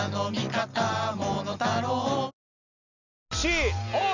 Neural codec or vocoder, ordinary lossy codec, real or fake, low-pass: none; none; real; 7.2 kHz